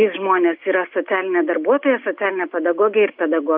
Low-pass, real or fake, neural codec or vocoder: 5.4 kHz; real; none